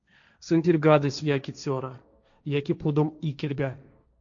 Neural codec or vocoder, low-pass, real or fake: codec, 16 kHz, 1.1 kbps, Voila-Tokenizer; 7.2 kHz; fake